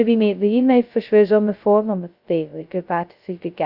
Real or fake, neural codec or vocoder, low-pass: fake; codec, 16 kHz, 0.2 kbps, FocalCodec; 5.4 kHz